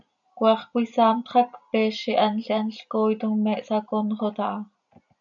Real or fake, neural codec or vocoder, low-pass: real; none; 7.2 kHz